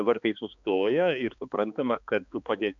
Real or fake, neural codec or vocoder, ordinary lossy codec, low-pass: fake; codec, 16 kHz, 2 kbps, X-Codec, HuBERT features, trained on balanced general audio; MP3, 64 kbps; 7.2 kHz